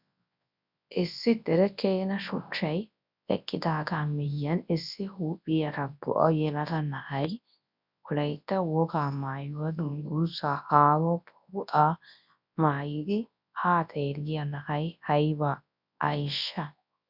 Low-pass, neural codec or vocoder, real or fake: 5.4 kHz; codec, 24 kHz, 0.9 kbps, WavTokenizer, large speech release; fake